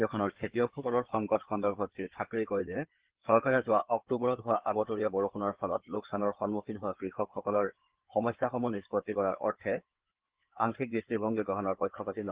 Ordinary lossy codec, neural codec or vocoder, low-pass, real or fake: Opus, 16 kbps; codec, 16 kHz in and 24 kHz out, 2.2 kbps, FireRedTTS-2 codec; 3.6 kHz; fake